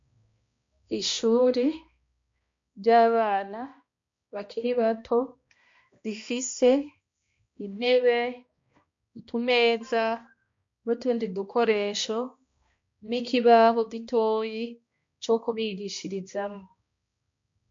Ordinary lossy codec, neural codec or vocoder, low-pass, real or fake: MP3, 48 kbps; codec, 16 kHz, 1 kbps, X-Codec, HuBERT features, trained on balanced general audio; 7.2 kHz; fake